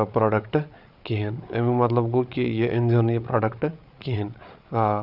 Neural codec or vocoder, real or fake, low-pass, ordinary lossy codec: codec, 16 kHz, 8 kbps, FreqCodec, larger model; fake; 5.4 kHz; none